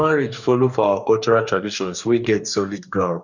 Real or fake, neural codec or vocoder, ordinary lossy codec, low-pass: fake; codec, 44.1 kHz, 2.6 kbps, DAC; none; 7.2 kHz